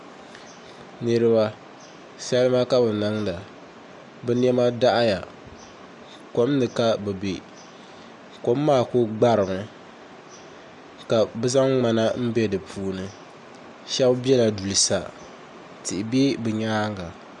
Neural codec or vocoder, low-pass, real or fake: none; 10.8 kHz; real